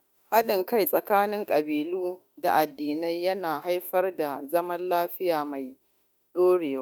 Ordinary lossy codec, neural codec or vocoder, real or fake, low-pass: none; autoencoder, 48 kHz, 32 numbers a frame, DAC-VAE, trained on Japanese speech; fake; none